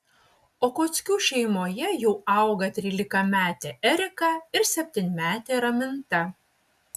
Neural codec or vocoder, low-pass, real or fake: none; 14.4 kHz; real